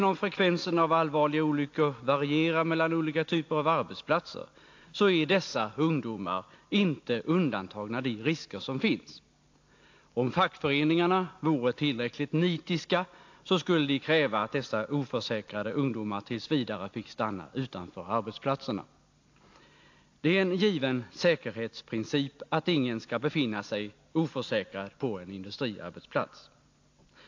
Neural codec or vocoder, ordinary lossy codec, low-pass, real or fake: none; AAC, 48 kbps; 7.2 kHz; real